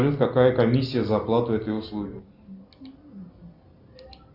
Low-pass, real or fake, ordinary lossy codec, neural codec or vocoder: 5.4 kHz; real; Opus, 64 kbps; none